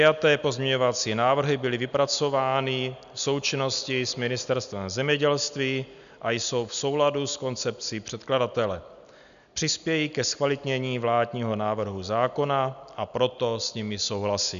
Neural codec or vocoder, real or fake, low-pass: none; real; 7.2 kHz